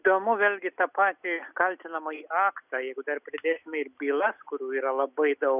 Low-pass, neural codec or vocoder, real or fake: 3.6 kHz; none; real